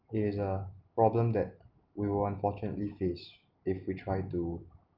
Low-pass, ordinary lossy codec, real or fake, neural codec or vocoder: 5.4 kHz; Opus, 32 kbps; real; none